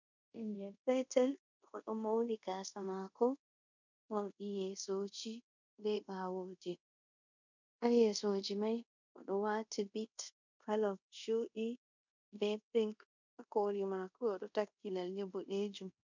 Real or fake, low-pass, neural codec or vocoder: fake; 7.2 kHz; codec, 24 kHz, 0.5 kbps, DualCodec